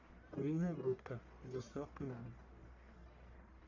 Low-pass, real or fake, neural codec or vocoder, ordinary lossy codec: 7.2 kHz; fake; codec, 44.1 kHz, 1.7 kbps, Pupu-Codec; MP3, 48 kbps